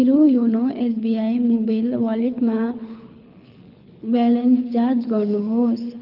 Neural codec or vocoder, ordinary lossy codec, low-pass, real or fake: codec, 24 kHz, 6 kbps, HILCodec; Opus, 32 kbps; 5.4 kHz; fake